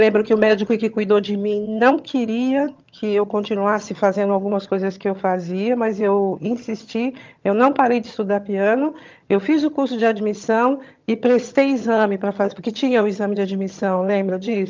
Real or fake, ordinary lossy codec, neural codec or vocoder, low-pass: fake; Opus, 24 kbps; vocoder, 22.05 kHz, 80 mel bands, HiFi-GAN; 7.2 kHz